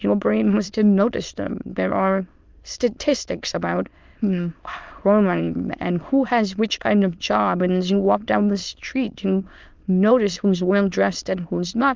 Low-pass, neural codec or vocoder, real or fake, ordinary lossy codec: 7.2 kHz; autoencoder, 22.05 kHz, a latent of 192 numbers a frame, VITS, trained on many speakers; fake; Opus, 32 kbps